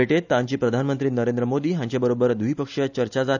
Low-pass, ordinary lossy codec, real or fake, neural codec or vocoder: 7.2 kHz; none; real; none